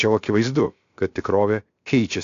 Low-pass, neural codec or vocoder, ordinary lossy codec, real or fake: 7.2 kHz; codec, 16 kHz, 0.7 kbps, FocalCodec; AAC, 48 kbps; fake